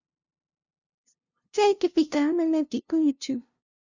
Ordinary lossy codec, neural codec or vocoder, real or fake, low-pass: Opus, 64 kbps; codec, 16 kHz, 0.5 kbps, FunCodec, trained on LibriTTS, 25 frames a second; fake; 7.2 kHz